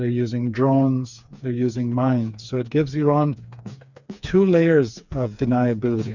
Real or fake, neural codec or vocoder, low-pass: fake; codec, 16 kHz, 4 kbps, FreqCodec, smaller model; 7.2 kHz